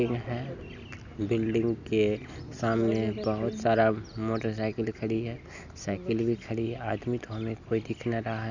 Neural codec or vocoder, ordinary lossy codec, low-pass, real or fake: none; none; 7.2 kHz; real